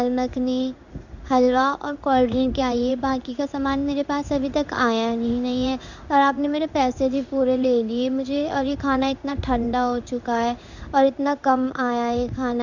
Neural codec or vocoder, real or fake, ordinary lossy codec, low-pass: codec, 16 kHz in and 24 kHz out, 1 kbps, XY-Tokenizer; fake; none; 7.2 kHz